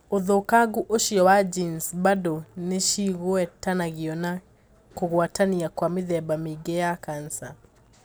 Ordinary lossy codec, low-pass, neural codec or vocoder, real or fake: none; none; none; real